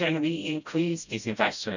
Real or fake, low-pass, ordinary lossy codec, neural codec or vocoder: fake; 7.2 kHz; none; codec, 16 kHz, 0.5 kbps, FreqCodec, smaller model